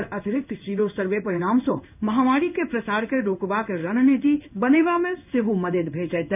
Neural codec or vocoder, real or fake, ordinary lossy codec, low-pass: codec, 16 kHz in and 24 kHz out, 1 kbps, XY-Tokenizer; fake; none; 3.6 kHz